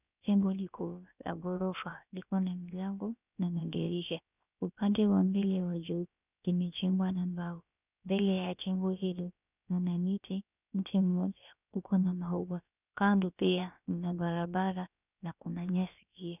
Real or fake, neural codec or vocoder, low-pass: fake; codec, 16 kHz, about 1 kbps, DyCAST, with the encoder's durations; 3.6 kHz